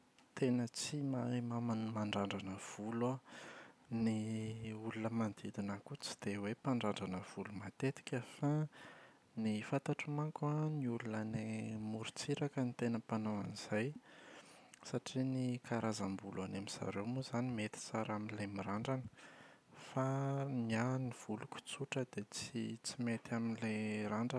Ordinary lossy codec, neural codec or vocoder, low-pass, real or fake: none; none; none; real